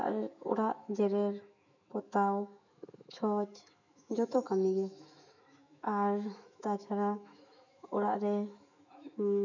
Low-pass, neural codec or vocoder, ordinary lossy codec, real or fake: 7.2 kHz; autoencoder, 48 kHz, 128 numbers a frame, DAC-VAE, trained on Japanese speech; none; fake